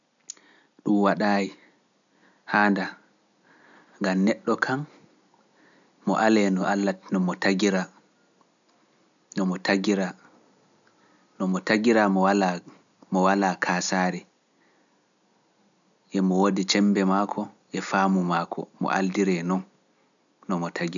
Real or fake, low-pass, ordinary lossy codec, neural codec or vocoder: real; 7.2 kHz; none; none